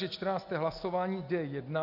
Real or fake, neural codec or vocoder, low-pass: real; none; 5.4 kHz